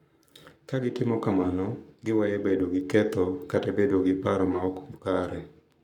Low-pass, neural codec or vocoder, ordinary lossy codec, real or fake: 19.8 kHz; codec, 44.1 kHz, 7.8 kbps, Pupu-Codec; none; fake